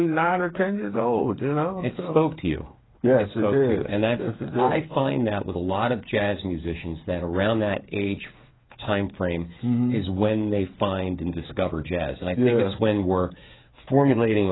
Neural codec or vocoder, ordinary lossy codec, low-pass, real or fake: codec, 16 kHz, 8 kbps, FreqCodec, smaller model; AAC, 16 kbps; 7.2 kHz; fake